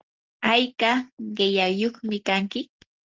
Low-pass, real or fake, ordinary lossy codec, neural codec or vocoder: 7.2 kHz; real; Opus, 16 kbps; none